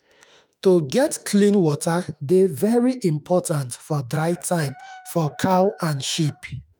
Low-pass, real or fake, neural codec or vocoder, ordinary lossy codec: none; fake; autoencoder, 48 kHz, 32 numbers a frame, DAC-VAE, trained on Japanese speech; none